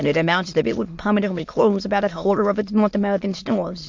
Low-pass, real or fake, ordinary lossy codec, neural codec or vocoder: 7.2 kHz; fake; MP3, 48 kbps; autoencoder, 22.05 kHz, a latent of 192 numbers a frame, VITS, trained on many speakers